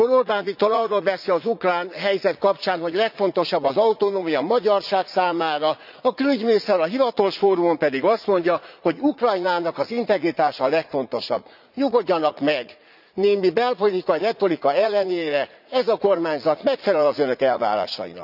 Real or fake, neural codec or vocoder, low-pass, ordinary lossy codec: fake; vocoder, 44.1 kHz, 80 mel bands, Vocos; 5.4 kHz; none